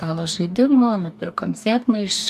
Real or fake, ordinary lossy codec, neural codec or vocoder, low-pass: fake; AAC, 96 kbps; codec, 44.1 kHz, 2.6 kbps, DAC; 14.4 kHz